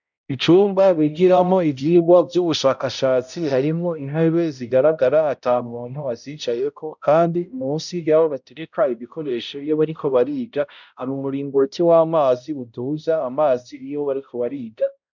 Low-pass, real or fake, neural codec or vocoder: 7.2 kHz; fake; codec, 16 kHz, 0.5 kbps, X-Codec, HuBERT features, trained on balanced general audio